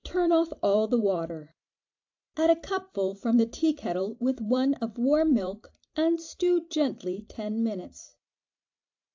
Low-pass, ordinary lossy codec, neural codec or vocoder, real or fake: 7.2 kHz; AAC, 48 kbps; vocoder, 44.1 kHz, 128 mel bands every 512 samples, BigVGAN v2; fake